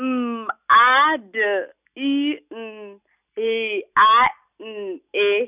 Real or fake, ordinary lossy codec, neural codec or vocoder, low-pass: real; none; none; 3.6 kHz